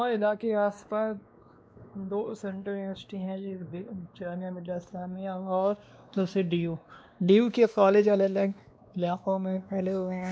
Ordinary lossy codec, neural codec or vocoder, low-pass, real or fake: none; codec, 16 kHz, 2 kbps, X-Codec, WavLM features, trained on Multilingual LibriSpeech; none; fake